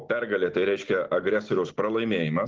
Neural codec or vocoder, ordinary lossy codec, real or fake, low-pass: none; Opus, 16 kbps; real; 7.2 kHz